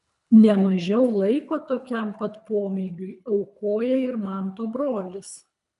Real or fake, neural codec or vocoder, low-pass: fake; codec, 24 kHz, 3 kbps, HILCodec; 10.8 kHz